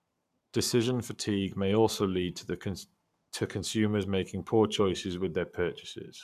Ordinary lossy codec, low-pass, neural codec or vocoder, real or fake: none; 14.4 kHz; codec, 44.1 kHz, 7.8 kbps, Pupu-Codec; fake